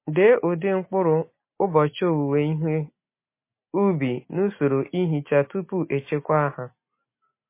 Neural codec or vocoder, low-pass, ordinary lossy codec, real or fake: none; 3.6 kHz; MP3, 24 kbps; real